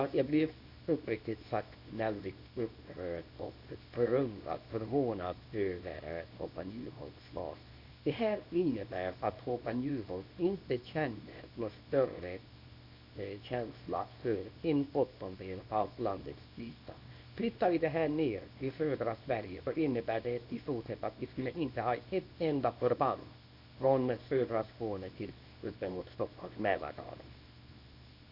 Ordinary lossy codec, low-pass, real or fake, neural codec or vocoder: none; 5.4 kHz; fake; codec, 24 kHz, 0.9 kbps, WavTokenizer, small release